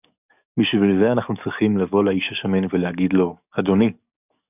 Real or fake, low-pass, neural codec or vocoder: fake; 3.6 kHz; codec, 44.1 kHz, 7.8 kbps, DAC